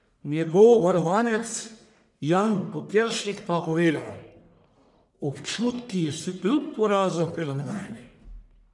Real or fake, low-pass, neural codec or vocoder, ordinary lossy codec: fake; 10.8 kHz; codec, 44.1 kHz, 1.7 kbps, Pupu-Codec; none